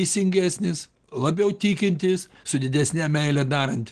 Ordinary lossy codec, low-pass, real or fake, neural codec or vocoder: Opus, 16 kbps; 9.9 kHz; real; none